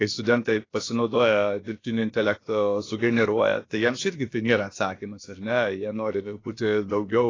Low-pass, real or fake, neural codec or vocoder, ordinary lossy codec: 7.2 kHz; fake; codec, 16 kHz, about 1 kbps, DyCAST, with the encoder's durations; AAC, 32 kbps